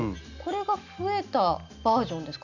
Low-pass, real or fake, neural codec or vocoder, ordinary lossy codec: 7.2 kHz; real; none; none